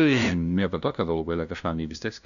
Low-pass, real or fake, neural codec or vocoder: 7.2 kHz; fake; codec, 16 kHz, 0.5 kbps, FunCodec, trained on LibriTTS, 25 frames a second